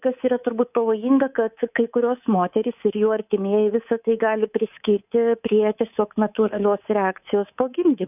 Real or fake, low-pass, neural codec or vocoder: fake; 3.6 kHz; codec, 24 kHz, 3.1 kbps, DualCodec